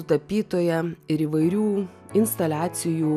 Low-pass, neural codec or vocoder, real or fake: 14.4 kHz; none; real